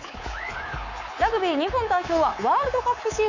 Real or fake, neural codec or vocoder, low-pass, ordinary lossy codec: fake; codec, 24 kHz, 3.1 kbps, DualCodec; 7.2 kHz; none